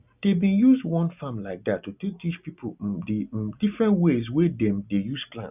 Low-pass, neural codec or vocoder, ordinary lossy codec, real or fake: 3.6 kHz; none; none; real